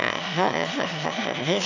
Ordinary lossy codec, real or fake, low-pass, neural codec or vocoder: none; fake; 7.2 kHz; autoencoder, 22.05 kHz, a latent of 192 numbers a frame, VITS, trained on one speaker